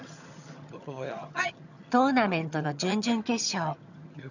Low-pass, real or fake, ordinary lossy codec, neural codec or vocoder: 7.2 kHz; fake; none; vocoder, 22.05 kHz, 80 mel bands, HiFi-GAN